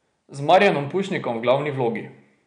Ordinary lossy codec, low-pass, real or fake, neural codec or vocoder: none; 9.9 kHz; real; none